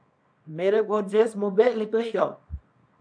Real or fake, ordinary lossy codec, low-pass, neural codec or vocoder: fake; AAC, 64 kbps; 9.9 kHz; codec, 24 kHz, 0.9 kbps, WavTokenizer, small release